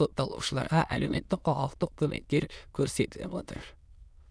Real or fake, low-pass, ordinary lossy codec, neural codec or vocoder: fake; none; none; autoencoder, 22.05 kHz, a latent of 192 numbers a frame, VITS, trained on many speakers